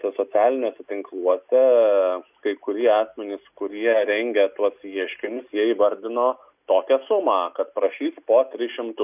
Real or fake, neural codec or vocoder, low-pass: real; none; 3.6 kHz